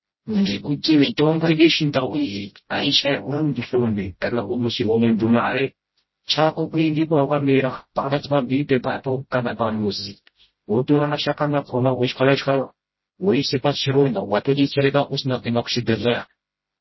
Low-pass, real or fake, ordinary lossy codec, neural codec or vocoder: 7.2 kHz; fake; MP3, 24 kbps; codec, 16 kHz, 0.5 kbps, FreqCodec, smaller model